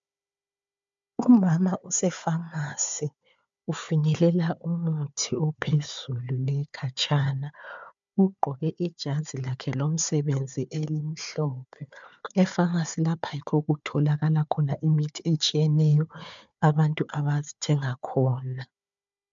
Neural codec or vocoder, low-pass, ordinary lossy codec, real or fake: codec, 16 kHz, 4 kbps, FunCodec, trained on Chinese and English, 50 frames a second; 7.2 kHz; MP3, 64 kbps; fake